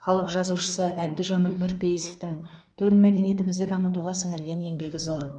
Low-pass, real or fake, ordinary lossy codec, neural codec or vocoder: 9.9 kHz; fake; none; codec, 24 kHz, 1 kbps, SNAC